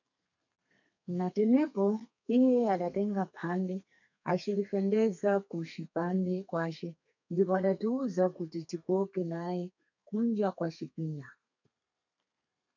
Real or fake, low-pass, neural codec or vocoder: fake; 7.2 kHz; codec, 32 kHz, 1.9 kbps, SNAC